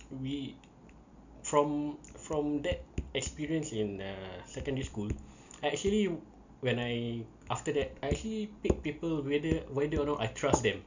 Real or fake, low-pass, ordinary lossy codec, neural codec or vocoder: real; 7.2 kHz; none; none